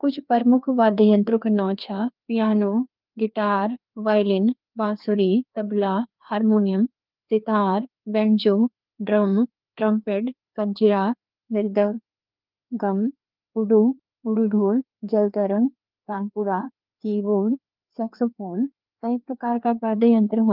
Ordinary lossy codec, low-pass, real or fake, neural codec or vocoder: Opus, 32 kbps; 5.4 kHz; fake; codec, 16 kHz, 2 kbps, FreqCodec, larger model